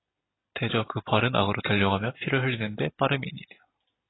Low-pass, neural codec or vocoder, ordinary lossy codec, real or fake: 7.2 kHz; none; AAC, 16 kbps; real